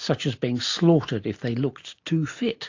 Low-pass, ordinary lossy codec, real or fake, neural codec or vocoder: 7.2 kHz; AAC, 48 kbps; real; none